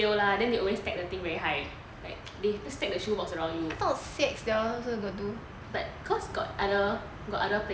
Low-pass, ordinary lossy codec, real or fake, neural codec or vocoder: none; none; real; none